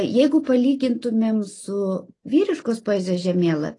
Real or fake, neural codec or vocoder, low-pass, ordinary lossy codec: real; none; 10.8 kHz; AAC, 32 kbps